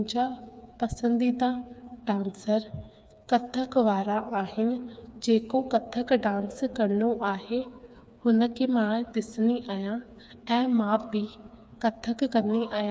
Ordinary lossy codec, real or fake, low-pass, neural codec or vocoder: none; fake; none; codec, 16 kHz, 4 kbps, FreqCodec, smaller model